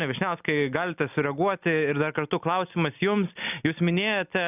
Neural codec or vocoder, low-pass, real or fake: none; 3.6 kHz; real